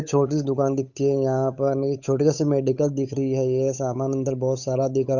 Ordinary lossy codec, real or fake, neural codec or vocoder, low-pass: none; fake; codec, 16 kHz, 8 kbps, FunCodec, trained on Chinese and English, 25 frames a second; 7.2 kHz